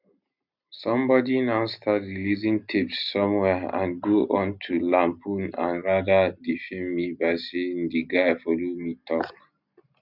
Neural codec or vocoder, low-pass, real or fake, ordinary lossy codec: none; 5.4 kHz; real; none